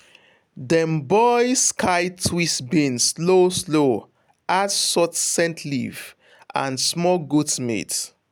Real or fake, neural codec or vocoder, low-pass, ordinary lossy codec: real; none; none; none